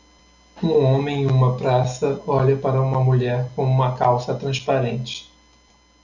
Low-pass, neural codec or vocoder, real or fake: 7.2 kHz; none; real